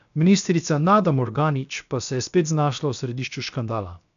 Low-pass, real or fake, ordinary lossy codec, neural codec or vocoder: 7.2 kHz; fake; none; codec, 16 kHz, 0.7 kbps, FocalCodec